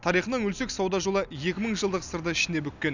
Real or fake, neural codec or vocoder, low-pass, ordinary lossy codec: real; none; 7.2 kHz; none